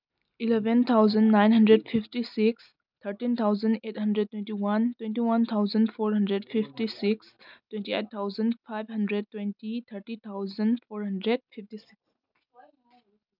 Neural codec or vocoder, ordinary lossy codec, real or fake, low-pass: none; none; real; 5.4 kHz